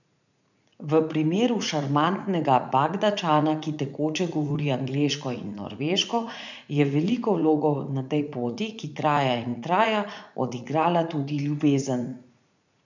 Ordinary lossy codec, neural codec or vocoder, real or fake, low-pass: none; vocoder, 44.1 kHz, 80 mel bands, Vocos; fake; 7.2 kHz